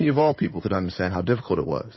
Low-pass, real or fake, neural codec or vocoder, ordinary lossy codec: 7.2 kHz; fake; codec, 16 kHz in and 24 kHz out, 2.2 kbps, FireRedTTS-2 codec; MP3, 24 kbps